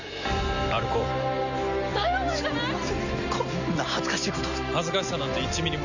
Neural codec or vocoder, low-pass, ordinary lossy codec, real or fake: none; 7.2 kHz; none; real